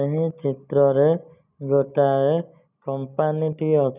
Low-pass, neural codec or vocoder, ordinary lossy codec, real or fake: 3.6 kHz; none; none; real